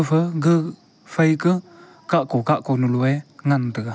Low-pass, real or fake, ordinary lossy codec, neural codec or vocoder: none; real; none; none